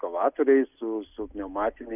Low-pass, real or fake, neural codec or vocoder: 3.6 kHz; real; none